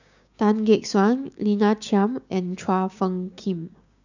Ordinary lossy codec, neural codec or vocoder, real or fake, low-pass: none; codec, 16 kHz, 6 kbps, DAC; fake; 7.2 kHz